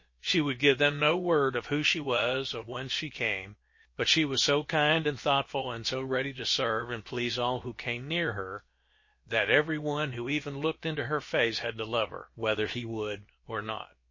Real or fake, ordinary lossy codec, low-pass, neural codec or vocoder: fake; MP3, 32 kbps; 7.2 kHz; codec, 16 kHz, about 1 kbps, DyCAST, with the encoder's durations